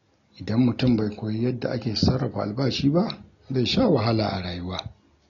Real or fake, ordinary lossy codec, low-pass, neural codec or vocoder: real; AAC, 32 kbps; 7.2 kHz; none